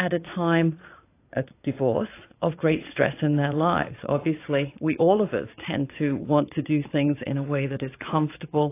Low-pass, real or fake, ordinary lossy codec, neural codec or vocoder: 3.6 kHz; fake; AAC, 24 kbps; vocoder, 22.05 kHz, 80 mel bands, Vocos